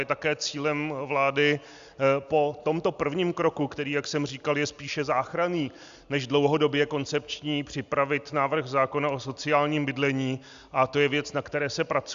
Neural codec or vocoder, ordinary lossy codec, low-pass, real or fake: none; Opus, 64 kbps; 7.2 kHz; real